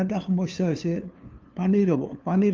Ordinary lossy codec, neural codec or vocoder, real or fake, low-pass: Opus, 32 kbps; codec, 16 kHz, 2 kbps, FunCodec, trained on LibriTTS, 25 frames a second; fake; 7.2 kHz